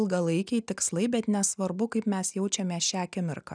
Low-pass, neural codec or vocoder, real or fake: 9.9 kHz; none; real